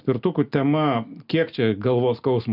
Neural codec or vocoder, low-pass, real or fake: none; 5.4 kHz; real